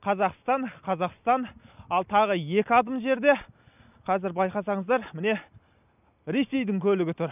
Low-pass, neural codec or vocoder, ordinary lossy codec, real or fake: 3.6 kHz; none; none; real